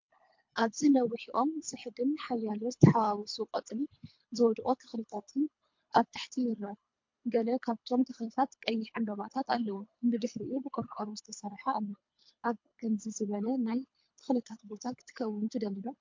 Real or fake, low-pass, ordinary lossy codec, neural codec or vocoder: fake; 7.2 kHz; MP3, 48 kbps; codec, 24 kHz, 3 kbps, HILCodec